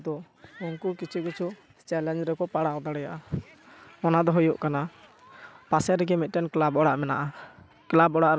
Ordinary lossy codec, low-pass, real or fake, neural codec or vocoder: none; none; real; none